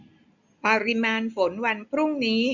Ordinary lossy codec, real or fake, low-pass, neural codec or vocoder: none; real; 7.2 kHz; none